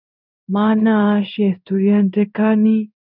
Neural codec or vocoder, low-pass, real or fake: codec, 16 kHz in and 24 kHz out, 1 kbps, XY-Tokenizer; 5.4 kHz; fake